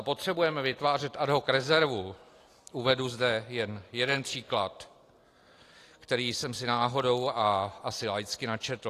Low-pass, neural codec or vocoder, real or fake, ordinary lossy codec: 14.4 kHz; none; real; AAC, 48 kbps